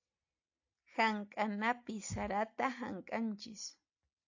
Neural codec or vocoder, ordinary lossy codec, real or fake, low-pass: none; AAC, 48 kbps; real; 7.2 kHz